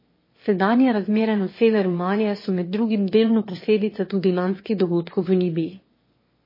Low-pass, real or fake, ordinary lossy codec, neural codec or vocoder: 5.4 kHz; fake; MP3, 24 kbps; autoencoder, 22.05 kHz, a latent of 192 numbers a frame, VITS, trained on one speaker